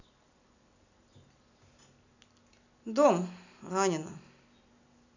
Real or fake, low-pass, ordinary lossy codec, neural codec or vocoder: real; 7.2 kHz; none; none